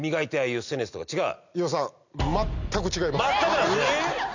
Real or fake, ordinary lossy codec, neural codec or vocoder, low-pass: real; none; none; 7.2 kHz